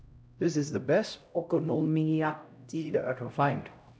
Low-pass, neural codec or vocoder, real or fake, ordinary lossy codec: none; codec, 16 kHz, 0.5 kbps, X-Codec, HuBERT features, trained on LibriSpeech; fake; none